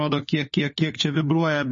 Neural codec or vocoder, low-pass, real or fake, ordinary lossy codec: codec, 16 kHz, 4 kbps, FunCodec, trained on LibriTTS, 50 frames a second; 7.2 kHz; fake; MP3, 32 kbps